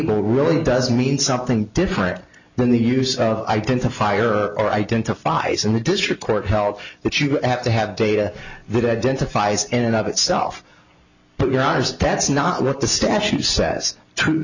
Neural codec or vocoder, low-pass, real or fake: none; 7.2 kHz; real